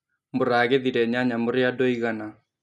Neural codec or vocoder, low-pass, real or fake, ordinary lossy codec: none; none; real; none